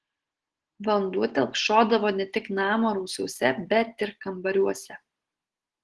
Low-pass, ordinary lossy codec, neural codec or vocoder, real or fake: 10.8 kHz; Opus, 16 kbps; none; real